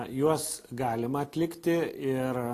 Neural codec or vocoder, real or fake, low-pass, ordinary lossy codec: none; real; 14.4 kHz; AAC, 48 kbps